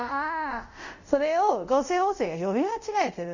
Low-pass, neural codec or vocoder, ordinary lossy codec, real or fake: 7.2 kHz; codec, 24 kHz, 0.5 kbps, DualCodec; none; fake